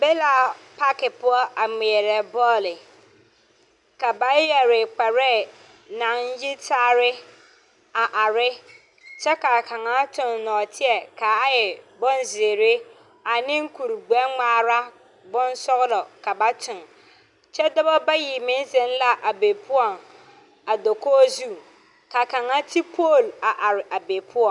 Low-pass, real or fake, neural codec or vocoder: 10.8 kHz; real; none